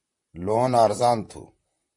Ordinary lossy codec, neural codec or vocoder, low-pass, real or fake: MP3, 48 kbps; vocoder, 44.1 kHz, 128 mel bands, Pupu-Vocoder; 10.8 kHz; fake